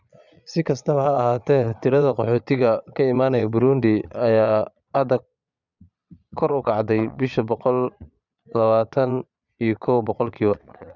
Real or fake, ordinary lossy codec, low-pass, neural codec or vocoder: fake; none; 7.2 kHz; vocoder, 22.05 kHz, 80 mel bands, Vocos